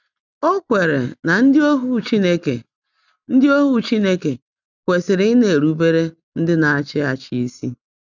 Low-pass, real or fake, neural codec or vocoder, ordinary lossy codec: 7.2 kHz; real; none; none